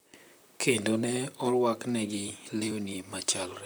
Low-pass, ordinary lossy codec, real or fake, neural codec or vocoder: none; none; fake; vocoder, 44.1 kHz, 128 mel bands, Pupu-Vocoder